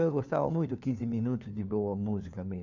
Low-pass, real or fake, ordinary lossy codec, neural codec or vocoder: 7.2 kHz; fake; none; codec, 16 kHz, 4 kbps, FunCodec, trained on LibriTTS, 50 frames a second